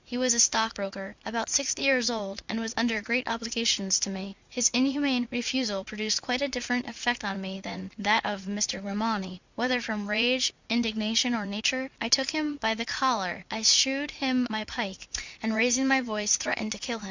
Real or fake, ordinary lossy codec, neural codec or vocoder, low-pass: fake; Opus, 64 kbps; vocoder, 44.1 kHz, 80 mel bands, Vocos; 7.2 kHz